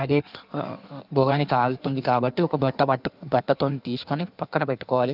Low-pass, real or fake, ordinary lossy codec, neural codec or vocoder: 5.4 kHz; fake; none; codec, 16 kHz in and 24 kHz out, 1.1 kbps, FireRedTTS-2 codec